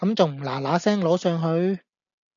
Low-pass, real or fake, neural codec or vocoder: 7.2 kHz; real; none